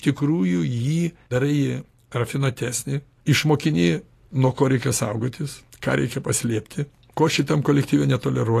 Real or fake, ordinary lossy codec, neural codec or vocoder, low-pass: real; AAC, 48 kbps; none; 14.4 kHz